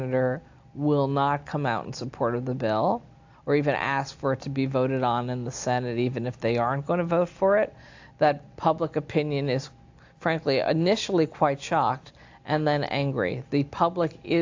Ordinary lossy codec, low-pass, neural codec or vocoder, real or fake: AAC, 48 kbps; 7.2 kHz; none; real